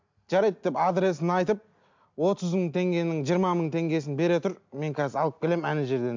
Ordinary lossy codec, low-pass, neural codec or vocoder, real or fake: MP3, 64 kbps; 7.2 kHz; none; real